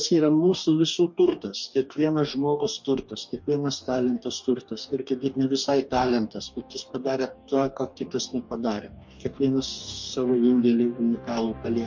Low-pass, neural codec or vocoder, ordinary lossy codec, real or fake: 7.2 kHz; codec, 44.1 kHz, 2.6 kbps, DAC; MP3, 48 kbps; fake